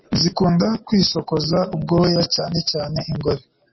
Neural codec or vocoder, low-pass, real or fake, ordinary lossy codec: none; 7.2 kHz; real; MP3, 24 kbps